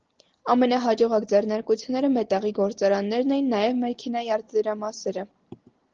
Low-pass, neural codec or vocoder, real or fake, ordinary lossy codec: 7.2 kHz; none; real; Opus, 16 kbps